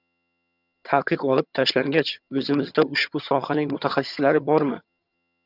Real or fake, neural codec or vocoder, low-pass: fake; vocoder, 22.05 kHz, 80 mel bands, HiFi-GAN; 5.4 kHz